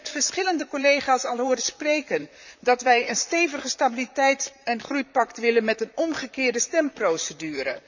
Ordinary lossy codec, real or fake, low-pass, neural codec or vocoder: none; fake; 7.2 kHz; vocoder, 44.1 kHz, 128 mel bands, Pupu-Vocoder